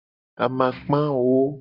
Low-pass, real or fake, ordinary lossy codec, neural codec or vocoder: 5.4 kHz; real; Opus, 64 kbps; none